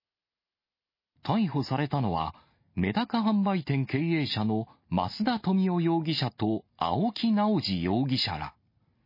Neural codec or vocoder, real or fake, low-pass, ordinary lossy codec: none; real; 5.4 kHz; MP3, 24 kbps